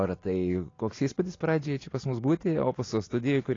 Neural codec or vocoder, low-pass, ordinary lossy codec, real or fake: none; 7.2 kHz; AAC, 32 kbps; real